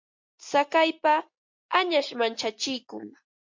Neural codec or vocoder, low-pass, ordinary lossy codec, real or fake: none; 7.2 kHz; MP3, 48 kbps; real